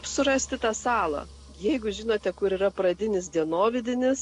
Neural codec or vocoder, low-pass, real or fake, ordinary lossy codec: none; 10.8 kHz; real; AAC, 48 kbps